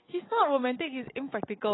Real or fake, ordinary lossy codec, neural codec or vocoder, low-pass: real; AAC, 16 kbps; none; 7.2 kHz